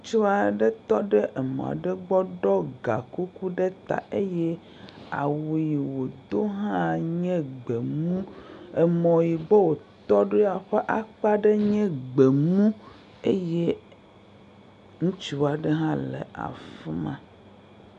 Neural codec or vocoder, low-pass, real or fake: none; 10.8 kHz; real